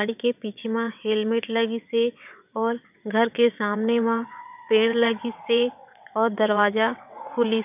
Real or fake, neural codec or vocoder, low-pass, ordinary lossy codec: fake; vocoder, 22.05 kHz, 80 mel bands, WaveNeXt; 3.6 kHz; none